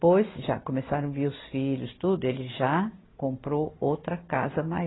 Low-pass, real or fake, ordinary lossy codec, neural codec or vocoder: 7.2 kHz; real; AAC, 16 kbps; none